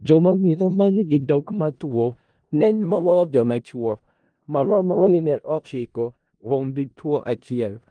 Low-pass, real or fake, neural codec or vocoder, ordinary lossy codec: 9.9 kHz; fake; codec, 16 kHz in and 24 kHz out, 0.4 kbps, LongCat-Audio-Codec, four codebook decoder; Opus, 32 kbps